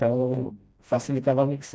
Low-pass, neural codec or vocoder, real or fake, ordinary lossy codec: none; codec, 16 kHz, 1 kbps, FreqCodec, smaller model; fake; none